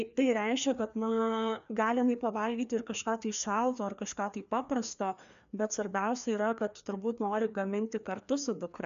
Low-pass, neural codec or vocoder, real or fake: 7.2 kHz; codec, 16 kHz, 2 kbps, FreqCodec, larger model; fake